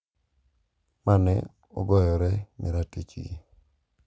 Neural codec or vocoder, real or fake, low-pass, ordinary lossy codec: none; real; none; none